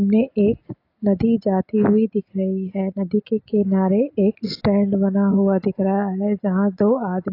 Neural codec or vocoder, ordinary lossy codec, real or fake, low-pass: none; AAC, 32 kbps; real; 5.4 kHz